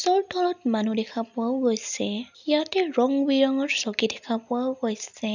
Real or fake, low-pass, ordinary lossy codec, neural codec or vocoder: real; 7.2 kHz; none; none